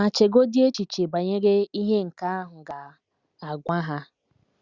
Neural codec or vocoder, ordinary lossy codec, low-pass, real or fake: none; Opus, 64 kbps; 7.2 kHz; real